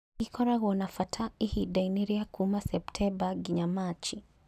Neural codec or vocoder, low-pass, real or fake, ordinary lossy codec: none; 14.4 kHz; real; none